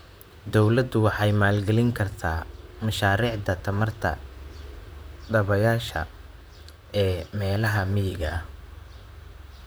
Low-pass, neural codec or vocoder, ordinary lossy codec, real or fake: none; vocoder, 44.1 kHz, 128 mel bands, Pupu-Vocoder; none; fake